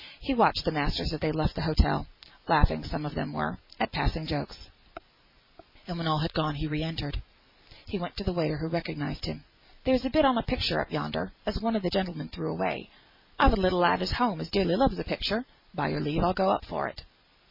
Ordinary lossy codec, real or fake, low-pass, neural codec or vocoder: MP3, 32 kbps; real; 5.4 kHz; none